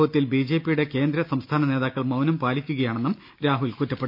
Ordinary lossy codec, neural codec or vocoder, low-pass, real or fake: none; none; 5.4 kHz; real